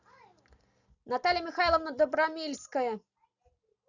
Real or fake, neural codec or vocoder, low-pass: real; none; 7.2 kHz